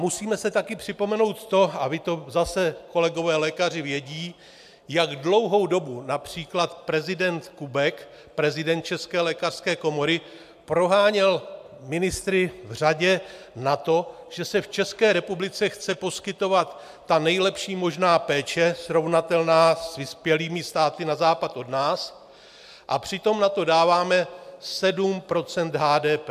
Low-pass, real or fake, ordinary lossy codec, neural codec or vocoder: 14.4 kHz; real; AAC, 96 kbps; none